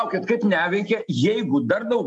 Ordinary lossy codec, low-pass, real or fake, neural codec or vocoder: MP3, 64 kbps; 10.8 kHz; real; none